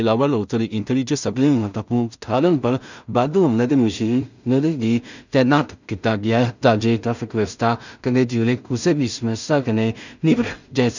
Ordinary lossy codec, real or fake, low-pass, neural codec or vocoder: none; fake; 7.2 kHz; codec, 16 kHz in and 24 kHz out, 0.4 kbps, LongCat-Audio-Codec, two codebook decoder